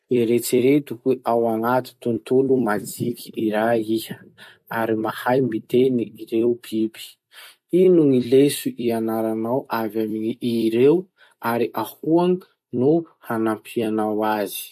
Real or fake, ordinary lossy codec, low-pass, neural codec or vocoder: fake; MP3, 64 kbps; 14.4 kHz; vocoder, 44.1 kHz, 128 mel bands every 256 samples, BigVGAN v2